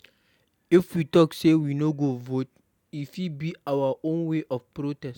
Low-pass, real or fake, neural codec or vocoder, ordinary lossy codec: 19.8 kHz; real; none; none